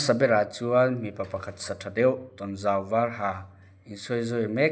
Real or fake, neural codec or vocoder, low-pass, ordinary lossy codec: real; none; none; none